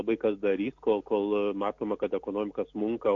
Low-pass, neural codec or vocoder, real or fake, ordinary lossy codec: 7.2 kHz; none; real; AAC, 48 kbps